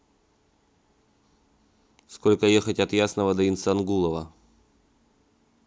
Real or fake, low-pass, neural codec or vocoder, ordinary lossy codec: real; none; none; none